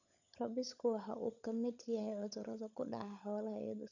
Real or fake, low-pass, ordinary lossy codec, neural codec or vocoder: fake; 7.2 kHz; none; codec, 16 kHz, 16 kbps, FunCodec, trained on LibriTTS, 50 frames a second